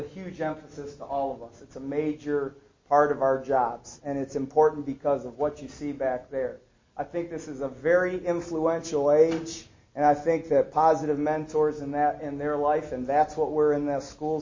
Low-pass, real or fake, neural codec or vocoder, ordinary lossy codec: 7.2 kHz; real; none; MP3, 32 kbps